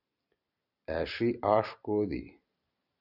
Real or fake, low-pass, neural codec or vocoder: fake; 5.4 kHz; vocoder, 44.1 kHz, 128 mel bands every 256 samples, BigVGAN v2